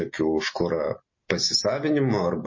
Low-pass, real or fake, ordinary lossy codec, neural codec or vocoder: 7.2 kHz; real; MP3, 32 kbps; none